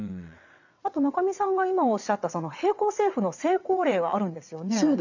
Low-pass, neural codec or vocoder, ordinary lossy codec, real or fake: 7.2 kHz; vocoder, 22.05 kHz, 80 mel bands, WaveNeXt; none; fake